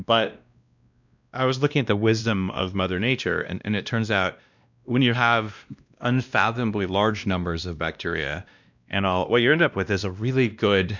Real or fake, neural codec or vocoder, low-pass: fake; codec, 16 kHz, 1 kbps, X-Codec, WavLM features, trained on Multilingual LibriSpeech; 7.2 kHz